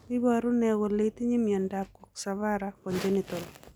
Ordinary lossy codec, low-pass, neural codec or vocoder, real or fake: none; none; none; real